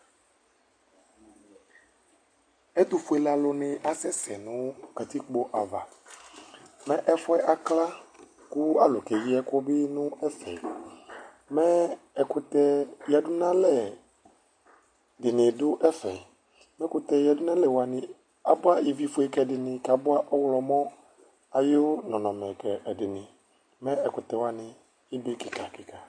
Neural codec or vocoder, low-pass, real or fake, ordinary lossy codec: none; 9.9 kHz; real; MP3, 48 kbps